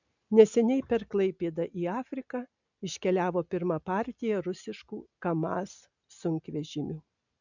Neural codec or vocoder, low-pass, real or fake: none; 7.2 kHz; real